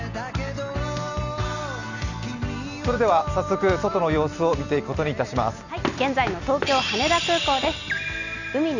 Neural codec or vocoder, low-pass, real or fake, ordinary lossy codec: none; 7.2 kHz; real; none